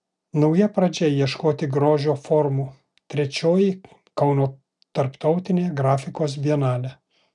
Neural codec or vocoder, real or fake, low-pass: none; real; 10.8 kHz